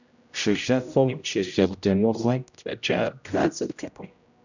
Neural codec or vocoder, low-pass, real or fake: codec, 16 kHz, 0.5 kbps, X-Codec, HuBERT features, trained on general audio; 7.2 kHz; fake